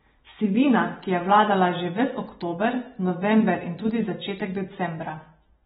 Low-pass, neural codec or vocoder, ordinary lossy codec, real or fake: 19.8 kHz; none; AAC, 16 kbps; real